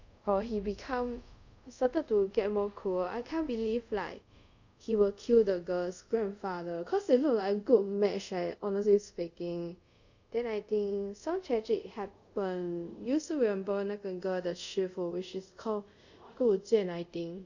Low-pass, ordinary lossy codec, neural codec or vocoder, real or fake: 7.2 kHz; none; codec, 24 kHz, 0.5 kbps, DualCodec; fake